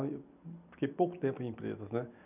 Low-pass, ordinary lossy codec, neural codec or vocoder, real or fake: 3.6 kHz; none; none; real